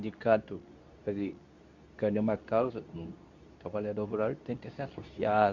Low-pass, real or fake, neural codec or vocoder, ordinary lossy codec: 7.2 kHz; fake; codec, 24 kHz, 0.9 kbps, WavTokenizer, medium speech release version 2; none